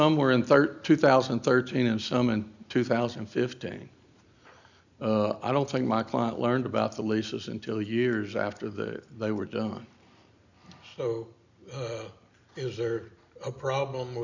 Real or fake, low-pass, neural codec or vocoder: real; 7.2 kHz; none